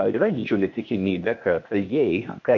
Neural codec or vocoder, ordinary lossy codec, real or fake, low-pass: codec, 16 kHz, 0.8 kbps, ZipCodec; MP3, 48 kbps; fake; 7.2 kHz